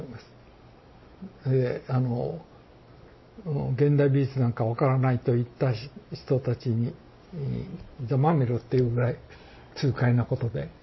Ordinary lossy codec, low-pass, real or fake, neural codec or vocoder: MP3, 24 kbps; 7.2 kHz; real; none